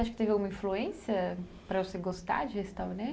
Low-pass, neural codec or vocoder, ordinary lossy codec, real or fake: none; none; none; real